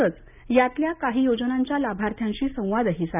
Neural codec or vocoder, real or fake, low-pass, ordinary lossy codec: none; real; 3.6 kHz; none